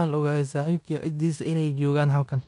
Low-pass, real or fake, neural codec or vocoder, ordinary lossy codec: 10.8 kHz; fake; codec, 16 kHz in and 24 kHz out, 0.9 kbps, LongCat-Audio-Codec, fine tuned four codebook decoder; none